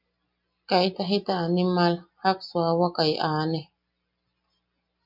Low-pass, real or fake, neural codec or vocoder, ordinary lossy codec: 5.4 kHz; real; none; MP3, 48 kbps